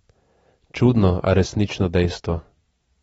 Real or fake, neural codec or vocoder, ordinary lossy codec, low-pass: real; none; AAC, 24 kbps; 10.8 kHz